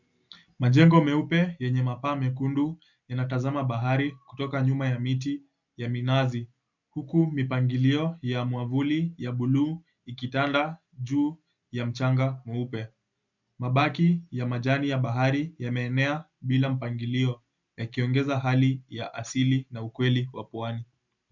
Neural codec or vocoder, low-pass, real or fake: none; 7.2 kHz; real